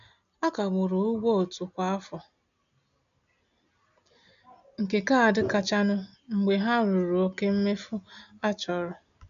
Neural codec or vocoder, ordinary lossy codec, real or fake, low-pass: none; none; real; 7.2 kHz